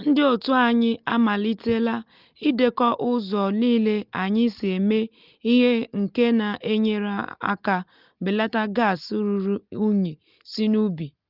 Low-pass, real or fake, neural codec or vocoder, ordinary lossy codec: 5.4 kHz; real; none; Opus, 32 kbps